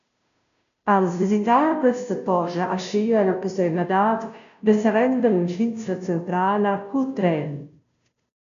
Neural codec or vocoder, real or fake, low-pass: codec, 16 kHz, 0.5 kbps, FunCodec, trained on Chinese and English, 25 frames a second; fake; 7.2 kHz